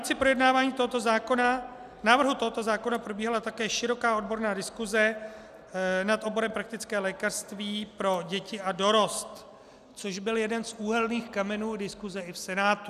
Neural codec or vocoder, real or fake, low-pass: none; real; 14.4 kHz